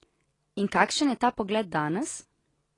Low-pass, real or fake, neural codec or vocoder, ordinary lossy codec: 10.8 kHz; real; none; AAC, 32 kbps